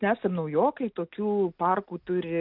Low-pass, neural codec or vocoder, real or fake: 5.4 kHz; none; real